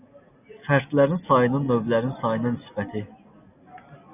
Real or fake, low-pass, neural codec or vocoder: real; 3.6 kHz; none